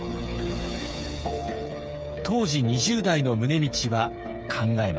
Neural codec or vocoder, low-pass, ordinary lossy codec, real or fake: codec, 16 kHz, 8 kbps, FreqCodec, smaller model; none; none; fake